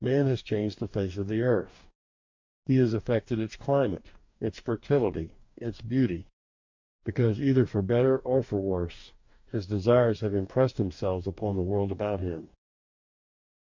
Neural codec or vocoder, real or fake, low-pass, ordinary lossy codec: codec, 44.1 kHz, 2.6 kbps, DAC; fake; 7.2 kHz; MP3, 48 kbps